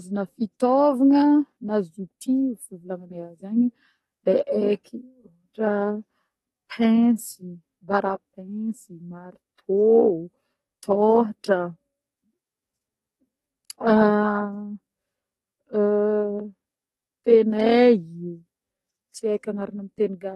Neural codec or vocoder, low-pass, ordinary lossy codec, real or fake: codec, 44.1 kHz, 7.8 kbps, DAC; 19.8 kHz; AAC, 32 kbps; fake